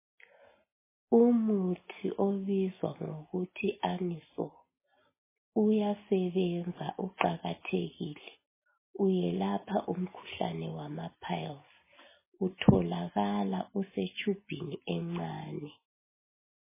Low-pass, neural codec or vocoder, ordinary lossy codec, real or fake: 3.6 kHz; none; MP3, 16 kbps; real